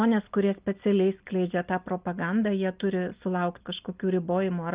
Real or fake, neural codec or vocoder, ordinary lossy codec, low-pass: real; none; Opus, 24 kbps; 3.6 kHz